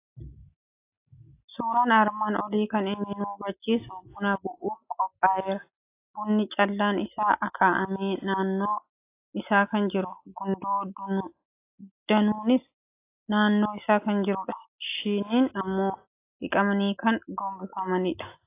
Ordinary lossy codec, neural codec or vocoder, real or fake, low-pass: AAC, 24 kbps; none; real; 3.6 kHz